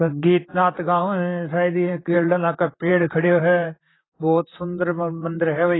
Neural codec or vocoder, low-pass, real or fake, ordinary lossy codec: vocoder, 44.1 kHz, 128 mel bands, Pupu-Vocoder; 7.2 kHz; fake; AAC, 16 kbps